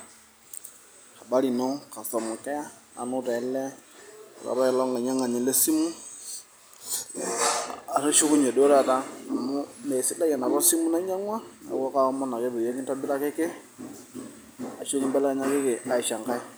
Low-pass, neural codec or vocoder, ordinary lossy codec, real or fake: none; none; none; real